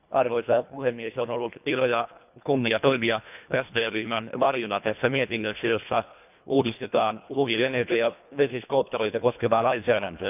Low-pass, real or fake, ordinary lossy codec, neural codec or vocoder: 3.6 kHz; fake; none; codec, 24 kHz, 1.5 kbps, HILCodec